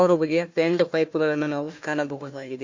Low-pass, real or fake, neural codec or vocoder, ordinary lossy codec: 7.2 kHz; fake; codec, 16 kHz, 1 kbps, FunCodec, trained on Chinese and English, 50 frames a second; MP3, 48 kbps